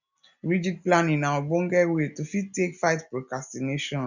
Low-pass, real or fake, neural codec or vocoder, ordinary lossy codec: 7.2 kHz; real; none; none